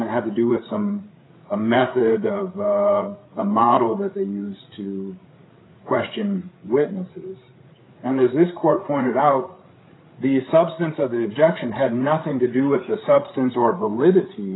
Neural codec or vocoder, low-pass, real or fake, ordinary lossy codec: codec, 16 kHz, 8 kbps, FreqCodec, larger model; 7.2 kHz; fake; AAC, 16 kbps